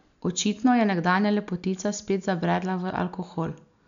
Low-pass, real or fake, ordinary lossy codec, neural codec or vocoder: 7.2 kHz; real; none; none